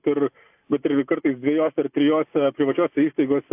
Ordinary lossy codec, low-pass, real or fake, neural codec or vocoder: AAC, 32 kbps; 3.6 kHz; real; none